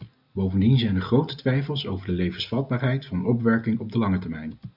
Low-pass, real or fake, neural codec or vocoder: 5.4 kHz; real; none